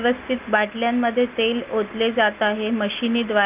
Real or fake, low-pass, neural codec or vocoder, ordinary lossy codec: real; 3.6 kHz; none; Opus, 32 kbps